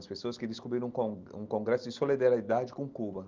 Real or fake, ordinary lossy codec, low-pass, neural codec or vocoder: real; Opus, 32 kbps; 7.2 kHz; none